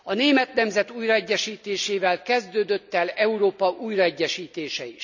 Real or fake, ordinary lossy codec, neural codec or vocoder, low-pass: real; none; none; 7.2 kHz